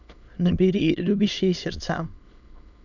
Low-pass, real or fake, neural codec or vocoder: 7.2 kHz; fake; autoencoder, 22.05 kHz, a latent of 192 numbers a frame, VITS, trained on many speakers